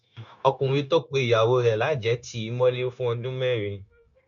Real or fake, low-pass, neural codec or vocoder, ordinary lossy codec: fake; 7.2 kHz; codec, 16 kHz, 0.9 kbps, LongCat-Audio-Codec; MP3, 64 kbps